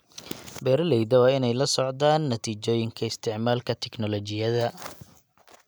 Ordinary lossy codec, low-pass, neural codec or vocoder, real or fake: none; none; none; real